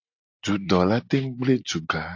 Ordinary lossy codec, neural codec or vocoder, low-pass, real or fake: AAC, 48 kbps; none; 7.2 kHz; real